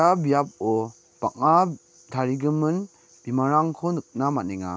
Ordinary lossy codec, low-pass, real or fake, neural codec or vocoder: none; none; real; none